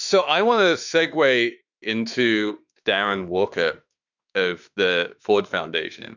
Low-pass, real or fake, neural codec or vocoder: 7.2 kHz; fake; autoencoder, 48 kHz, 32 numbers a frame, DAC-VAE, trained on Japanese speech